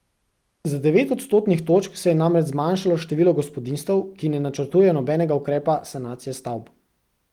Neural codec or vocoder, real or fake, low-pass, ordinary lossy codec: none; real; 19.8 kHz; Opus, 24 kbps